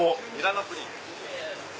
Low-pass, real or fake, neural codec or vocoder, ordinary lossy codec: none; real; none; none